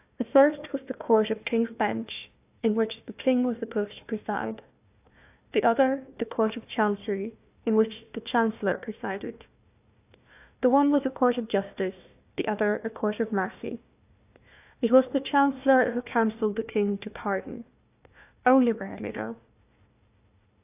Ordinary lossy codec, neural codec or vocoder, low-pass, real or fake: AAC, 32 kbps; codec, 16 kHz, 1 kbps, FunCodec, trained on Chinese and English, 50 frames a second; 3.6 kHz; fake